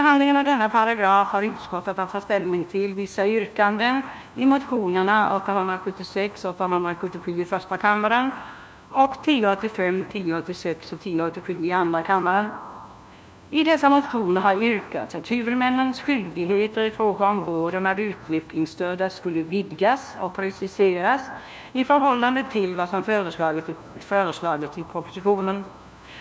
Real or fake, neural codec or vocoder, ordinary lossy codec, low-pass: fake; codec, 16 kHz, 1 kbps, FunCodec, trained on LibriTTS, 50 frames a second; none; none